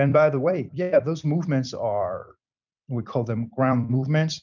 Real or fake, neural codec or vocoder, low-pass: fake; vocoder, 44.1 kHz, 128 mel bands every 256 samples, BigVGAN v2; 7.2 kHz